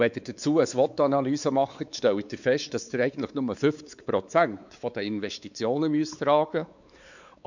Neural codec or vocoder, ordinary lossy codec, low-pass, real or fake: codec, 16 kHz, 4 kbps, X-Codec, WavLM features, trained on Multilingual LibriSpeech; none; 7.2 kHz; fake